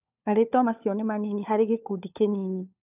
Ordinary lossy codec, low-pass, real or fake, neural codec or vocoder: none; 3.6 kHz; fake; codec, 16 kHz, 4 kbps, FunCodec, trained on LibriTTS, 50 frames a second